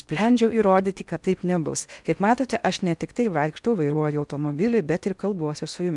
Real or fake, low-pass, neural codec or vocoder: fake; 10.8 kHz; codec, 16 kHz in and 24 kHz out, 0.6 kbps, FocalCodec, streaming, 4096 codes